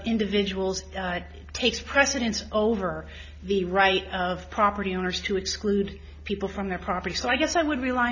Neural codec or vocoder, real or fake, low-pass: none; real; 7.2 kHz